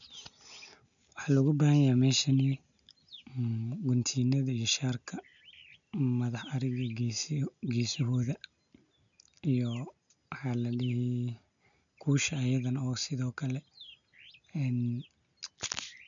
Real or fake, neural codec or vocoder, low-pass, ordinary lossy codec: real; none; 7.2 kHz; none